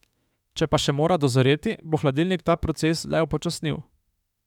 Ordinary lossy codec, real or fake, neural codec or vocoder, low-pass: none; fake; autoencoder, 48 kHz, 32 numbers a frame, DAC-VAE, trained on Japanese speech; 19.8 kHz